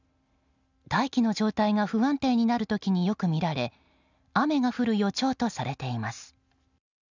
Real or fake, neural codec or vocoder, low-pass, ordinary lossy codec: real; none; 7.2 kHz; none